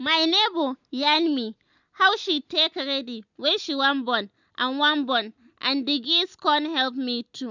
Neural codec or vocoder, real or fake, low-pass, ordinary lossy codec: none; real; 7.2 kHz; none